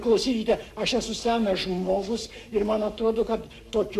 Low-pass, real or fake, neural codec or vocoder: 14.4 kHz; fake; codec, 44.1 kHz, 7.8 kbps, Pupu-Codec